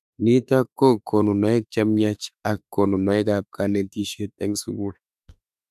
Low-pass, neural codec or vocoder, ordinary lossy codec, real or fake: 14.4 kHz; autoencoder, 48 kHz, 32 numbers a frame, DAC-VAE, trained on Japanese speech; none; fake